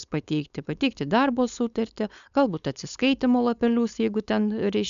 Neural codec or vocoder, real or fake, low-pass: codec, 16 kHz, 4.8 kbps, FACodec; fake; 7.2 kHz